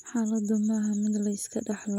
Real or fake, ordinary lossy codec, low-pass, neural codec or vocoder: real; none; 19.8 kHz; none